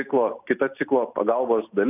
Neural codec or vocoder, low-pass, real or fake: none; 3.6 kHz; real